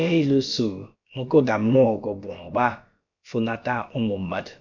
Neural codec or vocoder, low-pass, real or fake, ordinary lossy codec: codec, 16 kHz, about 1 kbps, DyCAST, with the encoder's durations; 7.2 kHz; fake; none